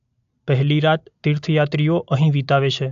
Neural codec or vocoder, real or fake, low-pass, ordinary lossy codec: none; real; 7.2 kHz; AAC, 64 kbps